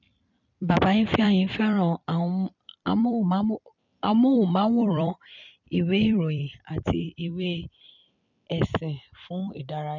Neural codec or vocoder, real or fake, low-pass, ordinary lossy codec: vocoder, 44.1 kHz, 128 mel bands every 256 samples, BigVGAN v2; fake; 7.2 kHz; none